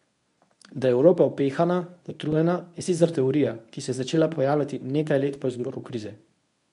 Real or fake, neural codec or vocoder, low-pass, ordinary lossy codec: fake; codec, 24 kHz, 0.9 kbps, WavTokenizer, medium speech release version 1; 10.8 kHz; none